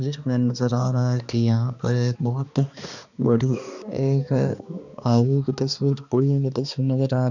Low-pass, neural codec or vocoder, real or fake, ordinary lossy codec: 7.2 kHz; codec, 16 kHz, 2 kbps, X-Codec, HuBERT features, trained on balanced general audio; fake; none